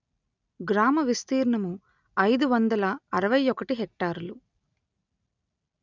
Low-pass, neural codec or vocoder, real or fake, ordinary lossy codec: 7.2 kHz; none; real; none